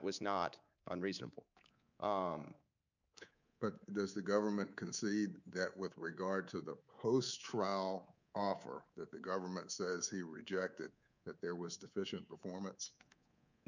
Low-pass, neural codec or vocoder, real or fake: 7.2 kHz; codec, 24 kHz, 3.1 kbps, DualCodec; fake